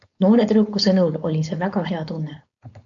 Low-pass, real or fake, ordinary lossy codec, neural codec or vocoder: 7.2 kHz; fake; AAC, 64 kbps; codec, 16 kHz, 4.8 kbps, FACodec